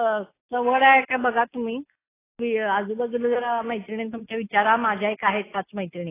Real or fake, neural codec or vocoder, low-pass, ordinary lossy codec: real; none; 3.6 kHz; AAC, 16 kbps